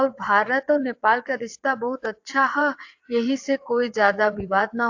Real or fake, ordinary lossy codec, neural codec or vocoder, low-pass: fake; AAC, 48 kbps; vocoder, 22.05 kHz, 80 mel bands, WaveNeXt; 7.2 kHz